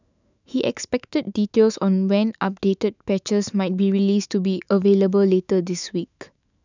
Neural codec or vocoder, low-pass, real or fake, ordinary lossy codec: autoencoder, 48 kHz, 128 numbers a frame, DAC-VAE, trained on Japanese speech; 7.2 kHz; fake; none